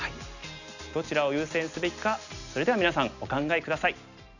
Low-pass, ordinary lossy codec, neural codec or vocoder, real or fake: 7.2 kHz; none; none; real